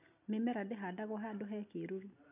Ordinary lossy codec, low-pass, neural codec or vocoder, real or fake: none; 3.6 kHz; none; real